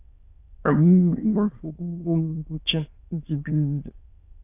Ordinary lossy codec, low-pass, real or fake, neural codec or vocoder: AAC, 24 kbps; 3.6 kHz; fake; autoencoder, 22.05 kHz, a latent of 192 numbers a frame, VITS, trained on many speakers